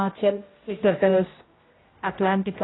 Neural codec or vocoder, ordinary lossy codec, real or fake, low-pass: codec, 16 kHz, 0.5 kbps, X-Codec, HuBERT features, trained on general audio; AAC, 16 kbps; fake; 7.2 kHz